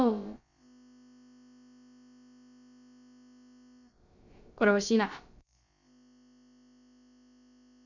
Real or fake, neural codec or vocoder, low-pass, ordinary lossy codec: fake; codec, 16 kHz, about 1 kbps, DyCAST, with the encoder's durations; 7.2 kHz; Opus, 64 kbps